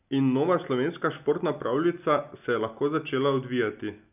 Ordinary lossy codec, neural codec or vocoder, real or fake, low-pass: none; none; real; 3.6 kHz